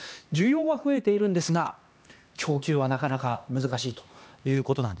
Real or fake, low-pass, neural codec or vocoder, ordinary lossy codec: fake; none; codec, 16 kHz, 2 kbps, X-Codec, HuBERT features, trained on LibriSpeech; none